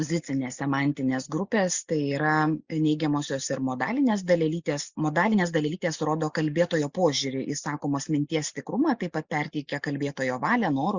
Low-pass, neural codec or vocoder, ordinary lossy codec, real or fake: 7.2 kHz; none; Opus, 64 kbps; real